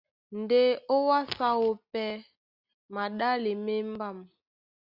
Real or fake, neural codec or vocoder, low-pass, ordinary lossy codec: real; none; 5.4 kHz; Opus, 64 kbps